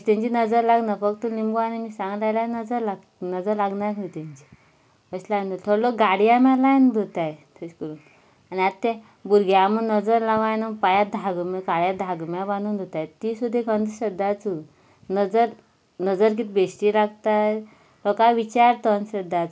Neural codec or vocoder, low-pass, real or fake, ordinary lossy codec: none; none; real; none